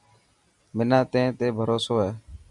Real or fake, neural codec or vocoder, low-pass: real; none; 10.8 kHz